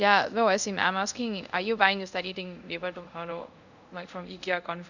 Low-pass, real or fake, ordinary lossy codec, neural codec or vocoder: 7.2 kHz; fake; none; codec, 24 kHz, 0.5 kbps, DualCodec